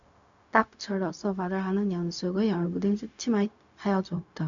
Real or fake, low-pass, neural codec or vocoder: fake; 7.2 kHz; codec, 16 kHz, 0.4 kbps, LongCat-Audio-Codec